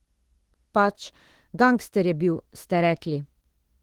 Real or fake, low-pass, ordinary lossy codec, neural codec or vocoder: fake; 19.8 kHz; Opus, 16 kbps; autoencoder, 48 kHz, 32 numbers a frame, DAC-VAE, trained on Japanese speech